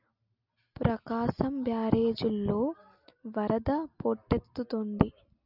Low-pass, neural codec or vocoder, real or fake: 5.4 kHz; none; real